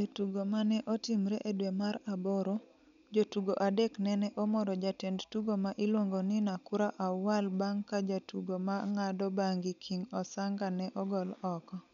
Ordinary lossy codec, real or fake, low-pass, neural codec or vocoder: none; real; 7.2 kHz; none